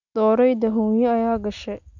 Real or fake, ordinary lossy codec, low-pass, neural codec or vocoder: real; none; 7.2 kHz; none